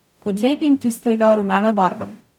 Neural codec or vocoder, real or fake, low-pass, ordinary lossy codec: codec, 44.1 kHz, 0.9 kbps, DAC; fake; 19.8 kHz; none